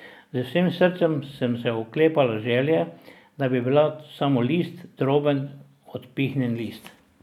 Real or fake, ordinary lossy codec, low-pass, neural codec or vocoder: real; none; 19.8 kHz; none